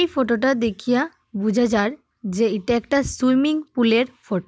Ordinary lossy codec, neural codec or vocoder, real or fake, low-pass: none; none; real; none